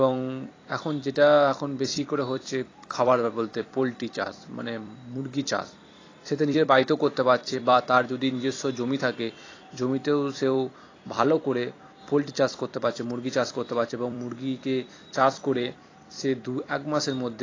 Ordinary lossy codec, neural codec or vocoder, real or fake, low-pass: AAC, 32 kbps; vocoder, 44.1 kHz, 128 mel bands every 256 samples, BigVGAN v2; fake; 7.2 kHz